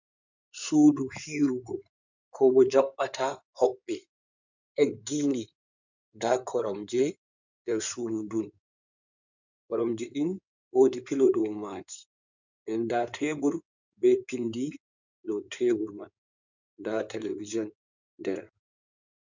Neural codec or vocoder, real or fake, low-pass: codec, 16 kHz in and 24 kHz out, 2.2 kbps, FireRedTTS-2 codec; fake; 7.2 kHz